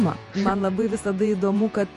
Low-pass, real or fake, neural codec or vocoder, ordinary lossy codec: 14.4 kHz; fake; vocoder, 48 kHz, 128 mel bands, Vocos; MP3, 48 kbps